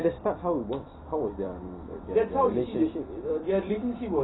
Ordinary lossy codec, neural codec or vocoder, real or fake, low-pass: AAC, 16 kbps; vocoder, 44.1 kHz, 128 mel bands every 256 samples, BigVGAN v2; fake; 7.2 kHz